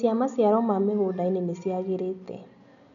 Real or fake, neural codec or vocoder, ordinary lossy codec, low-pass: real; none; none; 7.2 kHz